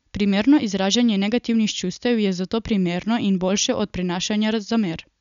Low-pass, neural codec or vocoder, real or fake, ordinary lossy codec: 7.2 kHz; none; real; none